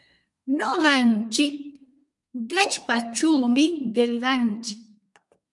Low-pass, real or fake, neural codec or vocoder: 10.8 kHz; fake; codec, 24 kHz, 1 kbps, SNAC